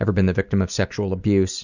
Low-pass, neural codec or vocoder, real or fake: 7.2 kHz; none; real